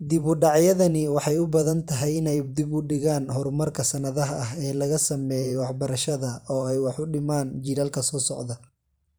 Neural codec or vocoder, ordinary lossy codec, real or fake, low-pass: vocoder, 44.1 kHz, 128 mel bands every 512 samples, BigVGAN v2; none; fake; none